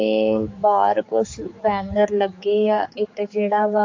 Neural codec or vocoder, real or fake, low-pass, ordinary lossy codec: codec, 16 kHz, 4 kbps, X-Codec, HuBERT features, trained on general audio; fake; 7.2 kHz; AAC, 48 kbps